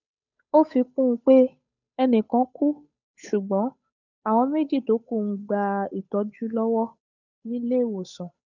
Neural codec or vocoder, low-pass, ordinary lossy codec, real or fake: codec, 16 kHz, 8 kbps, FunCodec, trained on Chinese and English, 25 frames a second; 7.2 kHz; none; fake